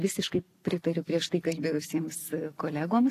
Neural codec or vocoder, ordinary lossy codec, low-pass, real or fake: none; AAC, 48 kbps; 14.4 kHz; real